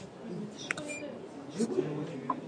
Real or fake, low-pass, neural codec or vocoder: real; 9.9 kHz; none